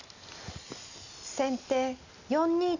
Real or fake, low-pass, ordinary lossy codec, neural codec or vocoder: real; 7.2 kHz; none; none